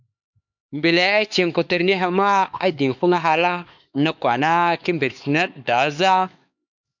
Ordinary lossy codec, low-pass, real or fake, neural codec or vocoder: MP3, 64 kbps; 7.2 kHz; fake; codec, 16 kHz, 4 kbps, X-Codec, HuBERT features, trained on LibriSpeech